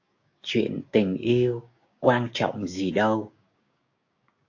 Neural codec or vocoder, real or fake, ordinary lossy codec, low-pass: codec, 16 kHz, 6 kbps, DAC; fake; AAC, 48 kbps; 7.2 kHz